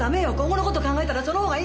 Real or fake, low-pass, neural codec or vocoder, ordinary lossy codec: real; none; none; none